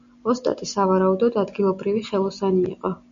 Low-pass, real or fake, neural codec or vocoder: 7.2 kHz; real; none